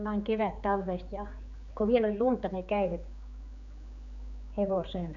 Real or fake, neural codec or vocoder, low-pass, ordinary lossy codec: fake; codec, 16 kHz, 4 kbps, X-Codec, HuBERT features, trained on general audio; 7.2 kHz; none